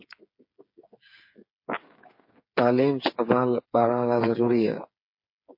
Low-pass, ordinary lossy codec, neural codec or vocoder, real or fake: 5.4 kHz; MP3, 32 kbps; codec, 16 kHz, 8 kbps, FreqCodec, smaller model; fake